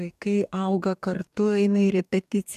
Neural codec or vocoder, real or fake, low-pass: codec, 44.1 kHz, 2.6 kbps, DAC; fake; 14.4 kHz